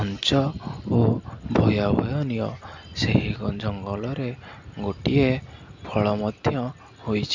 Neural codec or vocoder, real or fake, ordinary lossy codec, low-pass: none; real; MP3, 64 kbps; 7.2 kHz